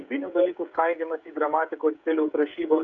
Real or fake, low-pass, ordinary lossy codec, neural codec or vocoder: fake; 7.2 kHz; MP3, 96 kbps; codec, 16 kHz, 16 kbps, FreqCodec, smaller model